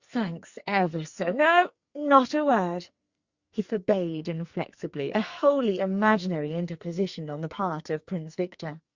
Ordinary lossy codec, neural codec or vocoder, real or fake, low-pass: Opus, 64 kbps; codec, 44.1 kHz, 2.6 kbps, SNAC; fake; 7.2 kHz